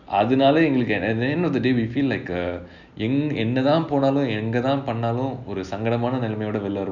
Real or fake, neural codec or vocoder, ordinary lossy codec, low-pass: real; none; none; 7.2 kHz